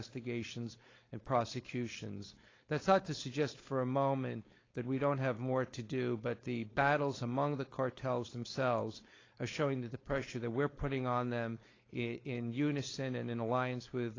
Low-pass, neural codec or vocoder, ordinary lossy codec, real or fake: 7.2 kHz; codec, 16 kHz, 4.8 kbps, FACodec; AAC, 32 kbps; fake